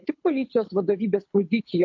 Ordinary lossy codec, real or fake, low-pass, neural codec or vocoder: MP3, 48 kbps; fake; 7.2 kHz; codec, 24 kHz, 6 kbps, HILCodec